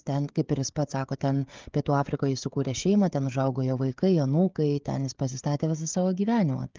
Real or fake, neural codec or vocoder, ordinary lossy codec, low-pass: fake; codec, 16 kHz, 16 kbps, FreqCodec, smaller model; Opus, 32 kbps; 7.2 kHz